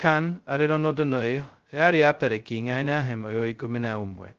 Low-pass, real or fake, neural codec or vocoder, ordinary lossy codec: 7.2 kHz; fake; codec, 16 kHz, 0.2 kbps, FocalCodec; Opus, 16 kbps